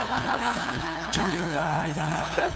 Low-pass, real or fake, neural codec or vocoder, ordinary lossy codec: none; fake; codec, 16 kHz, 2 kbps, FunCodec, trained on LibriTTS, 25 frames a second; none